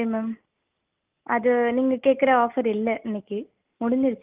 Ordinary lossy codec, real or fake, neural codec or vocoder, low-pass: Opus, 24 kbps; real; none; 3.6 kHz